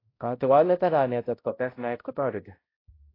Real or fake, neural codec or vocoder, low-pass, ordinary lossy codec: fake; codec, 16 kHz, 0.5 kbps, X-Codec, HuBERT features, trained on balanced general audio; 5.4 kHz; AAC, 24 kbps